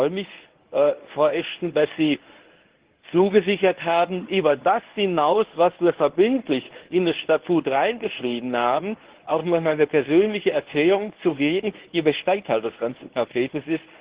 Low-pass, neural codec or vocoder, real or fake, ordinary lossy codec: 3.6 kHz; codec, 24 kHz, 0.9 kbps, WavTokenizer, medium speech release version 1; fake; Opus, 16 kbps